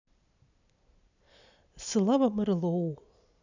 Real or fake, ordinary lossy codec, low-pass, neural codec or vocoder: real; none; 7.2 kHz; none